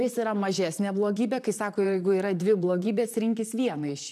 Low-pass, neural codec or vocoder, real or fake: 14.4 kHz; vocoder, 44.1 kHz, 128 mel bands, Pupu-Vocoder; fake